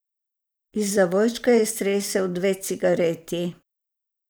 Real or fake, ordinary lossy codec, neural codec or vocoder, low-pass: fake; none; vocoder, 44.1 kHz, 128 mel bands every 512 samples, BigVGAN v2; none